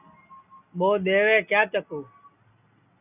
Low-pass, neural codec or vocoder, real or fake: 3.6 kHz; none; real